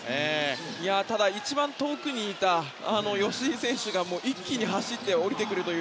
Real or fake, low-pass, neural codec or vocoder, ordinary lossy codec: real; none; none; none